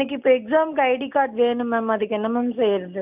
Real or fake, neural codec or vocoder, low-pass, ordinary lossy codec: real; none; 3.6 kHz; none